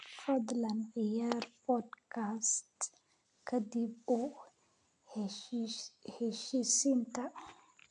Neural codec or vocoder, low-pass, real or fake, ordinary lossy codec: none; 9.9 kHz; real; AAC, 64 kbps